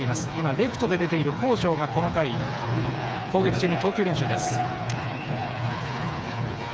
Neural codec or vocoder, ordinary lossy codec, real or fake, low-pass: codec, 16 kHz, 4 kbps, FreqCodec, smaller model; none; fake; none